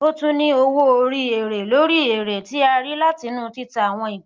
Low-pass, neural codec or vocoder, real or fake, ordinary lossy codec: 7.2 kHz; none; real; Opus, 24 kbps